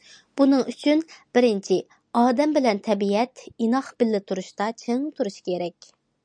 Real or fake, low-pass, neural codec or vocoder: real; 9.9 kHz; none